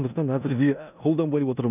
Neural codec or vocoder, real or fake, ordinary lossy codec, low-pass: codec, 16 kHz in and 24 kHz out, 0.9 kbps, LongCat-Audio-Codec, four codebook decoder; fake; none; 3.6 kHz